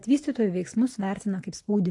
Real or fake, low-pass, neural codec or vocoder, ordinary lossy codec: real; 10.8 kHz; none; AAC, 48 kbps